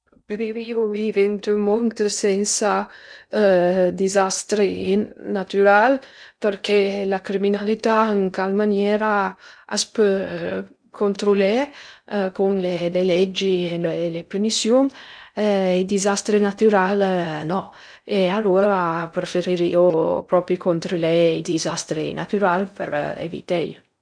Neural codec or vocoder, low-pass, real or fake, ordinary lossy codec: codec, 16 kHz in and 24 kHz out, 0.6 kbps, FocalCodec, streaming, 2048 codes; 9.9 kHz; fake; none